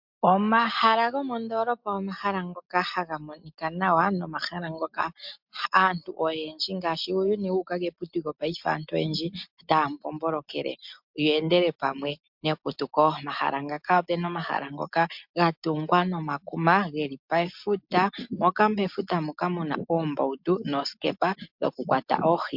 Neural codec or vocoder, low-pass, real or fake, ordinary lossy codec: none; 5.4 kHz; real; MP3, 48 kbps